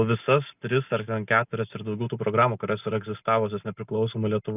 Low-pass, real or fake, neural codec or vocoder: 3.6 kHz; real; none